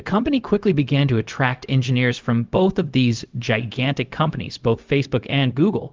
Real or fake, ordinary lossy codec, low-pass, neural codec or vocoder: fake; Opus, 32 kbps; 7.2 kHz; codec, 16 kHz, 0.4 kbps, LongCat-Audio-Codec